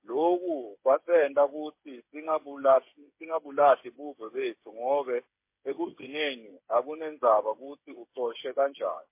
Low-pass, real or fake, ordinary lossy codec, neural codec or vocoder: 3.6 kHz; fake; MP3, 24 kbps; codec, 16 kHz, 8 kbps, FreqCodec, smaller model